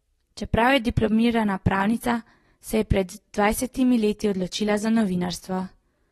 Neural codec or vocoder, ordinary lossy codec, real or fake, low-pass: none; AAC, 32 kbps; real; 19.8 kHz